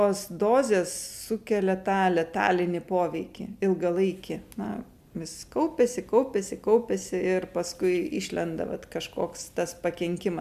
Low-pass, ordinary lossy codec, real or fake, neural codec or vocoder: 14.4 kHz; MP3, 96 kbps; real; none